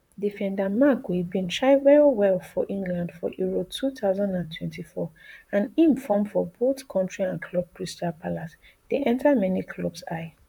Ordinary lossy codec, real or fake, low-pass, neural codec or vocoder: none; fake; 19.8 kHz; vocoder, 44.1 kHz, 128 mel bands, Pupu-Vocoder